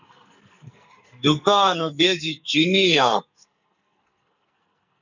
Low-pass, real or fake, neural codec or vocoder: 7.2 kHz; fake; codec, 44.1 kHz, 2.6 kbps, SNAC